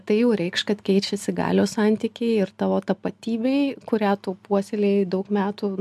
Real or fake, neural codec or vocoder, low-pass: real; none; 14.4 kHz